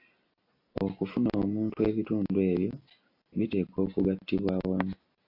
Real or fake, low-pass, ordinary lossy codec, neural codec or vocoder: real; 5.4 kHz; AAC, 32 kbps; none